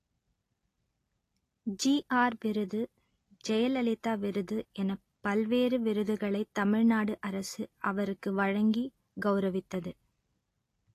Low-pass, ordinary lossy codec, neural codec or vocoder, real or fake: 14.4 kHz; AAC, 48 kbps; none; real